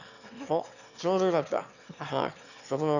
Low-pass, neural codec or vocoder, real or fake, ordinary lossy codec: 7.2 kHz; autoencoder, 22.05 kHz, a latent of 192 numbers a frame, VITS, trained on one speaker; fake; none